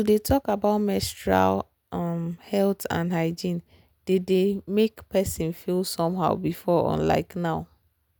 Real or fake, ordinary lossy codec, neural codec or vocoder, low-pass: real; none; none; none